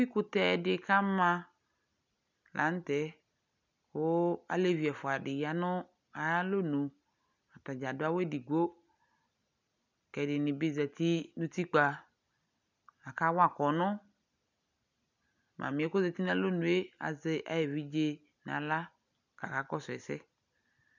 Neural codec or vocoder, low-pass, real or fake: none; 7.2 kHz; real